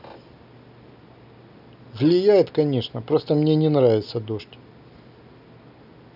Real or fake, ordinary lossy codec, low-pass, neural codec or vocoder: real; none; 5.4 kHz; none